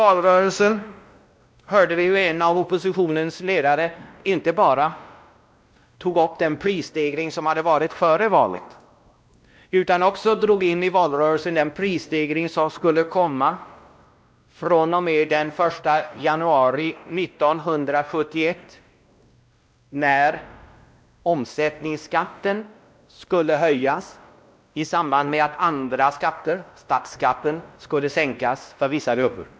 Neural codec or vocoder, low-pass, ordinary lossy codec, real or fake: codec, 16 kHz, 1 kbps, X-Codec, WavLM features, trained on Multilingual LibriSpeech; none; none; fake